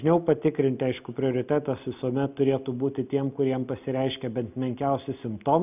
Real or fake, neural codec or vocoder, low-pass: real; none; 3.6 kHz